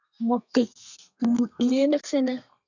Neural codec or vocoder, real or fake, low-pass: codec, 32 kHz, 1.9 kbps, SNAC; fake; 7.2 kHz